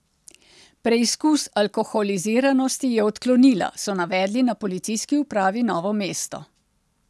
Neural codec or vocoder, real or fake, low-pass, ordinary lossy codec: none; real; none; none